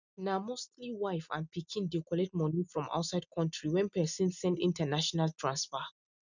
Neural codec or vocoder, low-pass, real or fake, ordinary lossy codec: none; 7.2 kHz; real; none